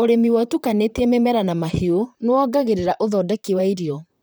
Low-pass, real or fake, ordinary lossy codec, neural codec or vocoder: none; fake; none; vocoder, 44.1 kHz, 128 mel bands, Pupu-Vocoder